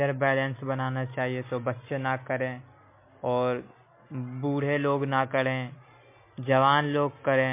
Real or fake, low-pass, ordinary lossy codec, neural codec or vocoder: real; 3.6 kHz; MP3, 24 kbps; none